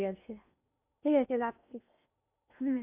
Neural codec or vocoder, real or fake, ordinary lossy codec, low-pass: codec, 16 kHz in and 24 kHz out, 0.8 kbps, FocalCodec, streaming, 65536 codes; fake; none; 3.6 kHz